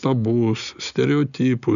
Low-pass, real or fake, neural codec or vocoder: 7.2 kHz; real; none